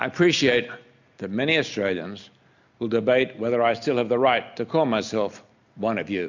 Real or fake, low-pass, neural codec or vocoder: real; 7.2 kHz; none